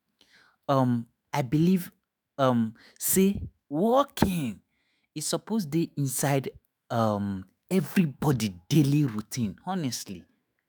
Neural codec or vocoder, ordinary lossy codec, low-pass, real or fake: autoencoder, 48 kHz, 128 numbers a frame, DAC-VAE, trained on Japanese speech; none; none; fake